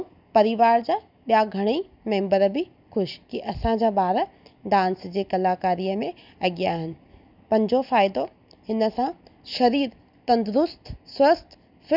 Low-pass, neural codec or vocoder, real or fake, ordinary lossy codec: 5.4 kHz; none; real; none